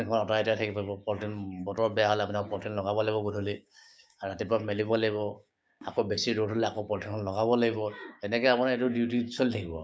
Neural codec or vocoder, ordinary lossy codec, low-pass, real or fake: codec, 16 kHz, 6 kbps, DAC; none; none; fake